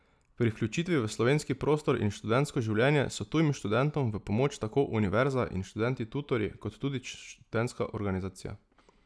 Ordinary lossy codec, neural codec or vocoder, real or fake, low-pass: none; none; real; none